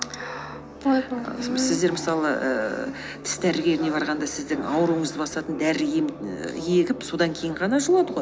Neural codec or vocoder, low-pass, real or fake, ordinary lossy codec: none; none; real; none